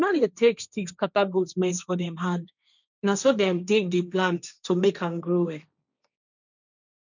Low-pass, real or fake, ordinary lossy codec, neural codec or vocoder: 7.2 kHz; fake; none; codec, 16 kHz, 1.1 kbps, Voila-Tokenizer